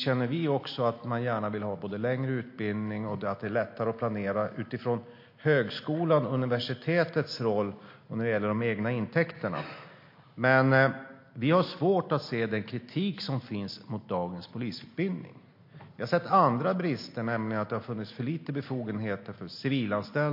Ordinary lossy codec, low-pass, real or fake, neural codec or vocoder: MP3, 32 kbps; 5.4 kHz; real; none